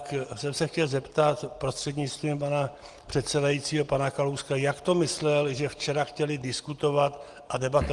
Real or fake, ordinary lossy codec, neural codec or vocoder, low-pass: real; Opus, 24 kbps; none; 10.8 kHz